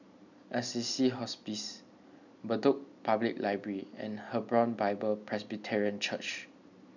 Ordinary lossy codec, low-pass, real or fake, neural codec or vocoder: none; 7.2 kHz; real; none